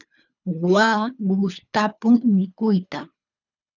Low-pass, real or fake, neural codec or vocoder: 7.2 kHz; fake; codec, 24 kHz, 3 kbps, HILCodec